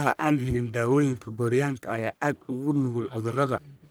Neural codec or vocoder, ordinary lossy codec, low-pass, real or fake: codec, 44.1 kHz, 1.7 kbps, Pupu-Codec; none; none; fake